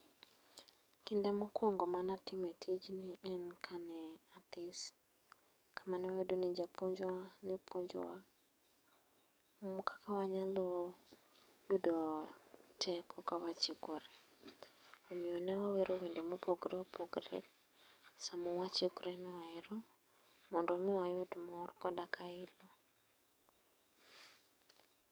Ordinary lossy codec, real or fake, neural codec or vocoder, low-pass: none; fake; codec, 44.1 kHz, 7.8 kbps, DAC; none